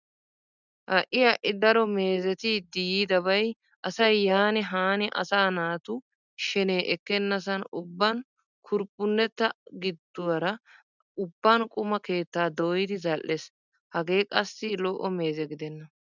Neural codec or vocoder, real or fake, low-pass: none; real; 7.2 kHz